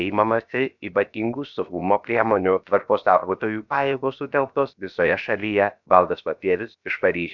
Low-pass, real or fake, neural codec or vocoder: 7.2 kHz; fake; codec, 16 kHz, about 1 kbps, DyCAST, with the encoder's durations